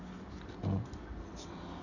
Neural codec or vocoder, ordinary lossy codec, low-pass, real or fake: none; none; 7.2 kHz; real